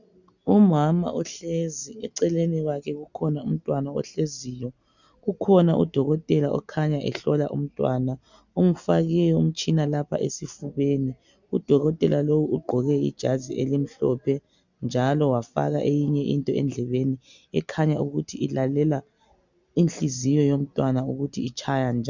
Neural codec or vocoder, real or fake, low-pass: none; real; 7.2 kHz